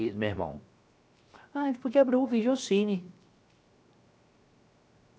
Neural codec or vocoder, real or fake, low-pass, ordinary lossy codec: codec, 16 kHz, 0.7 kbps, FocalCodec; fake; none; none